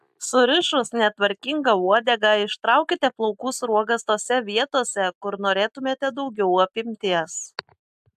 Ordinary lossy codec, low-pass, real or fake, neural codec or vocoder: AAC, 96 kbps; 14.4 kHz; real; none